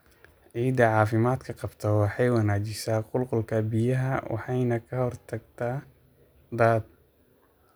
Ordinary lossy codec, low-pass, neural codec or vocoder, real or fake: none; none; none; real